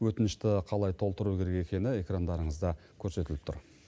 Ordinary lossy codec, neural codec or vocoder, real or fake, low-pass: none; none; real; none